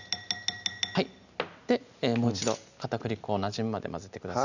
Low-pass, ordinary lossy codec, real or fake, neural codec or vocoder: 7.2 kHz; none; real; none